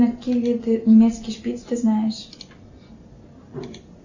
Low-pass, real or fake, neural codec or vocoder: 7.2 kHz; real; none